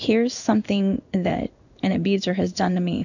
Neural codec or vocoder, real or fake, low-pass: codec, 16 kHz in and 24 kHz out, 1 kbps, XY-Tokenizer; fake; 7.2 kHz